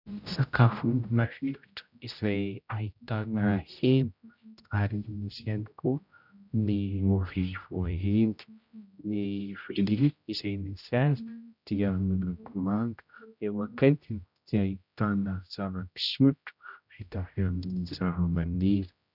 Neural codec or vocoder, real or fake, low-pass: codec, 16 kHz, 0.5 kbps, X-Codec, HuBERT features, trained on general audio; fake; 5.4 kHz